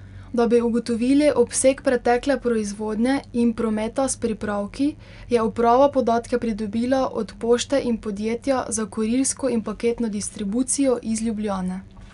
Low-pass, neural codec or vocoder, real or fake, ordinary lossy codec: 10.8 kHz; none; real; none